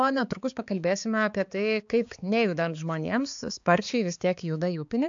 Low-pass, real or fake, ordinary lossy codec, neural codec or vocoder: 7.2 kHz; fake; AAC, 64 kbps; codec, 16 kHz, 4 kbps, X-Codec, HuBERT features, trained on balanced general audio